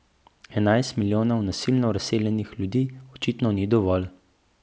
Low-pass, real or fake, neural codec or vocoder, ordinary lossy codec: none; real; none; none